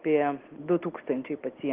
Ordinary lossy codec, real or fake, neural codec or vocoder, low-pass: Opus, 16 kbps; real; none; 3.6 kHz